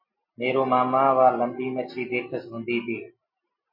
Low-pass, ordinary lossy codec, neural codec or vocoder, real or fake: 5.4 kHz; MP3, 24 kbps; none; real